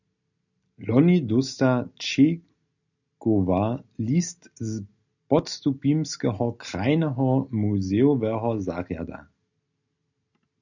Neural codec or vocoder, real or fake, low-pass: none; real; 7.2 kHz